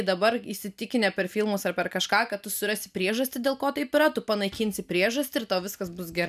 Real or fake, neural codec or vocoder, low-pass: real; none; 14.4 kHz